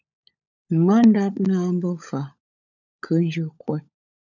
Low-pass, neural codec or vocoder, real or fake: 7.2 kHz; codec, 16 kHz, 16 kbps, FunCodec, trained on LibriTTS, 50 frames a second; fake